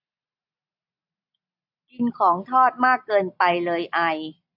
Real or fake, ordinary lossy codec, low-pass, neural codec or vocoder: real; AAC, 48 kbps; 5.4 kHz; none